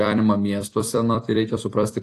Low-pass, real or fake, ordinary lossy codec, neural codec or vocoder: 14.4 kHz; fake; AAC, 48 kbps; vocoder, 44.1 kHz, 128 mel bands every 256 samples, BigVGAN v2